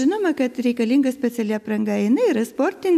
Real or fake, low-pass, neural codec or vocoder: real; 14.4 kHz; none